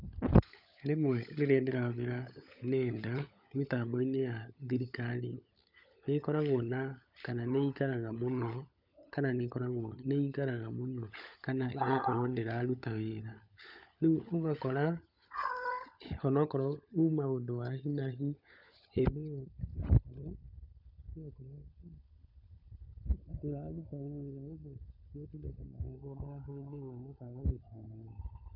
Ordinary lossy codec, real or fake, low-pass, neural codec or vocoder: none; fake; 5.4 kHz; codec, 16 kHz, 16 kbps, FunCodec, trained on LibriTTS, 50 frames a second